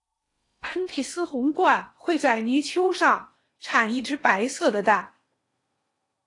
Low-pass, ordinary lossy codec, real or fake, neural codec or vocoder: 10.8 kHz; AAC, 48 kbps; fake; codec, 16 kHz in and 24 kHz out, 0.8 kbps, FocalCodec, streaming, 65536 codes